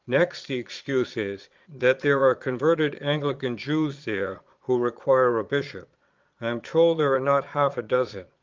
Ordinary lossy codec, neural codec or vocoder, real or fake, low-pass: Opus, 32 kbps; vocoder, 22.05 kHz, 80 mel bands, Vocos; fake; 7.2 kHz